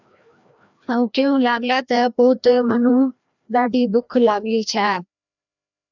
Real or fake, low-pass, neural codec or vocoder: fake; 7.2 kHz; codec, 16 kHz, 1 kbps, FreqCodec, larger model